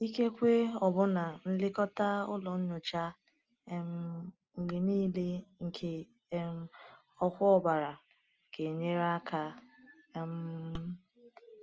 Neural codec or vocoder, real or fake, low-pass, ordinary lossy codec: none; real; 7.2 kHz; Opus, 24 kbps